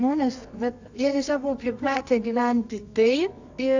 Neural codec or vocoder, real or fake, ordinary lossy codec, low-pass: codec, 24 kHz, 0.9 kbps, WavTokenizer, medium music audio release; fake; AAC, 48 kbps; 7.2 kHz